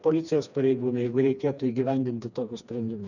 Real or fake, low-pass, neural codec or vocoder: fake; 7.2 kHz; codec, 16 kHz, 2 kbps, FreqCodec, smaller model